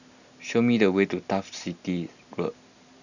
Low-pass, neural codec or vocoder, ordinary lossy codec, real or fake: 7.2 kHz; none; none; real